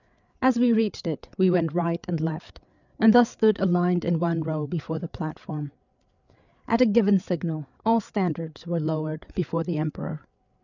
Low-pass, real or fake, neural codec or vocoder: 7.2 kHz; fake; codec, 16 kHz, 8 kbps, FreqCodec, larger model